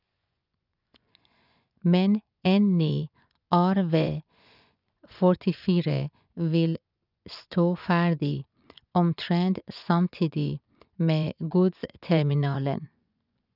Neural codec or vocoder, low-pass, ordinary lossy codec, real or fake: vocoder, 24 kHz, 100 mel bands, Vocos; 5.4 kHz; none; fake